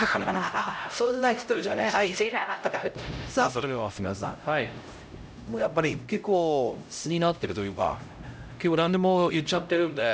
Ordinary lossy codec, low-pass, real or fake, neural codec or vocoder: none; none; fake; codec, 16 kHz, 0.5 kbps, X-Codec, HuBERT features, trained on LibriSpeech